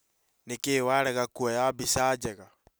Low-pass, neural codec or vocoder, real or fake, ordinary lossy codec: none; none; real; none